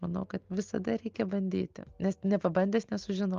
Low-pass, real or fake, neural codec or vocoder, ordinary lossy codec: 7.2 kHz; real; none; Opus, 32 kbps